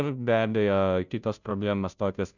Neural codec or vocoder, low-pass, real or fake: codec, 16 kHz, 0.5 kbps, FunCodec, trained on Chinese and English, 25 frames a second; 7.2 kHz; fake